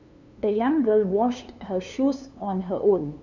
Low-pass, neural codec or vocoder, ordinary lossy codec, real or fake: 7.2 kHz; codec, 16 kHz, 2 kbps, FunCodec, trained on LibriTTS, 25 frames a second; none; fake